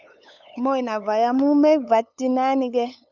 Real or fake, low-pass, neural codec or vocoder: fake; 7.2 kHz; codec, 16 kHz, 8 kbps, FunCodec, trained on LibriTTS, 25 frames a second